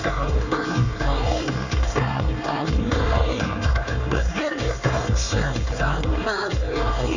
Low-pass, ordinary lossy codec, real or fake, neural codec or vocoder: 7.2 kHz; none; fake; codec, 24 kHz, 1 kbps, SNAC